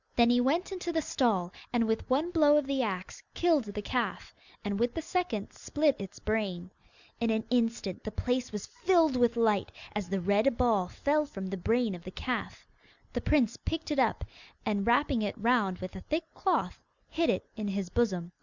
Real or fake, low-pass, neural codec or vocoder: real; 7.2 kHz; none